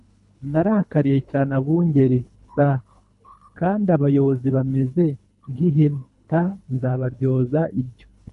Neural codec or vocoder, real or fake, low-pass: codec, 24 kHz, 3 kbps, HILCodec; fake; 10.8 kHz